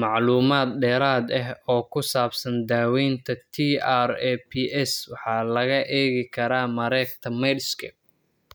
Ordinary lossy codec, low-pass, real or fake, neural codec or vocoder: none; none; real; none